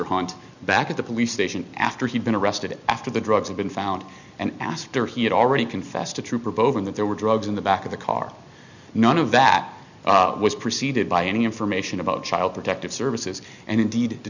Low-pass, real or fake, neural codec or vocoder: 7.2 kHz; real; none